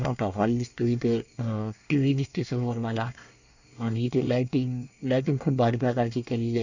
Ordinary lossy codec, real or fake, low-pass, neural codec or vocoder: none; fake; 7.2 kHz; codec, 24 kHz, 1 kbps, SNAC